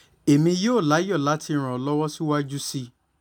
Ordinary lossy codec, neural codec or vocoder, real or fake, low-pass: none; none; real; 19.8 kHz